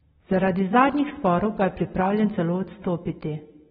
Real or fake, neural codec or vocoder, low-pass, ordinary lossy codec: real; none; 14.4 kHz; AAC, 16 kbps